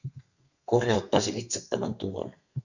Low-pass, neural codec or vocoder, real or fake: 7.2 kHz; codec, 32 kHz, 1.9 kbps, SNAC; fake